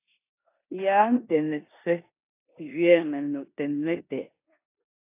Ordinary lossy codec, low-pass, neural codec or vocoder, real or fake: AAC, 24 kbps; 3.6 kHz; codec, 16 kHz in and 24 kHz out, 0.9 kbps, LongCat-Audio-Codec, four codebook decoder; fake